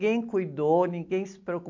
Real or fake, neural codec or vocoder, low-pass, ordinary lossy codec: real; none; 7.2 kHz; MP3, 48 kbps